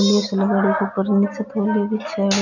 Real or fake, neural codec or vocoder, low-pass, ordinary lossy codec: real; none; 7.2 kHz; none